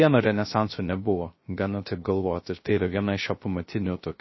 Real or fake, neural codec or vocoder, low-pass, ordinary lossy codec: fake; codec, 16 kHz, 0.3 kbps, FocalCodec; 7.2 kHz; MP3, 24 kbps